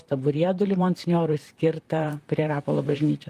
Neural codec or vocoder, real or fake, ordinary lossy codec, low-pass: vocoder, 44.1 kHz, 128 mel bands, Pupu-Vocoder; fake; Opus, 32 kbps; 14.4 kHz